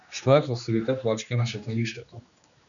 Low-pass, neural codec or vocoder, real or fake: 7.2 kHz; codec, 16 kHz, 2 kbps, X-Codec, HuBERT features, trained on general audio; fake